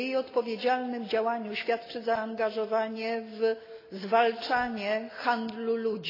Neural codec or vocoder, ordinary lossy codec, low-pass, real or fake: none; AAC, 24 kbps; 5.4 kHz; real